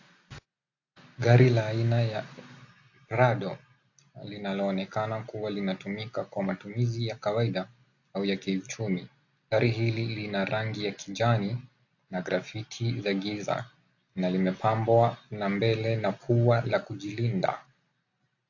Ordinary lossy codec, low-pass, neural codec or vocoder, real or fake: AAC, 48 kbps; 7.2 kHz; none; real